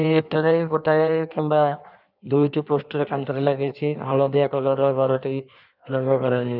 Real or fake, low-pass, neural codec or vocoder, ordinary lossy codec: fake; 5.4 kHz; codec, 16 kHz in and 24 kHz out, 1.1 kbps, FireRedTTS-2 codec; none